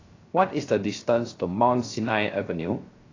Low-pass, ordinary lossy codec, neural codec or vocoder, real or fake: 7.2 kHz; AAC, 32 kbps; codec, 16 kHz, 0.3 kbps, FocalCodec; fake